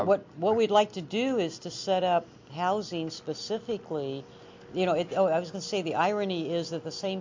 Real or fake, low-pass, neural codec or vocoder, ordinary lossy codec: real; 7.2 kHz; none; MP3, 48 kbps